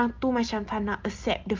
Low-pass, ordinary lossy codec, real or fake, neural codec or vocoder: 7.2 kHz; Opus, 24 kbps; real; none